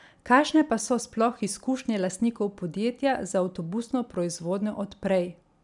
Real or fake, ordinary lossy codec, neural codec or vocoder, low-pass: real; none; none; 10.8 kHz